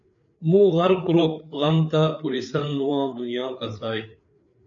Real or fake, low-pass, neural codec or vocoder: fake; 7.2 kHz; codec, 16 kHz, 4 kbps, FreqCodec, larger model